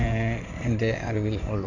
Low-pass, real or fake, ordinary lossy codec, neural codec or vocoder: 7.2 kHz; fake; none; codec, 16 kHz in and 24 kHz out, 2.2 kbps, FireRedTTS-2 codec